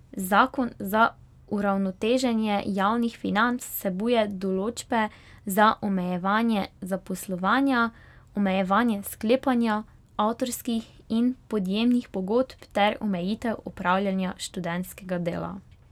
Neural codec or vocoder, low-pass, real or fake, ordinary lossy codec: none; 19.8 kHz; real; none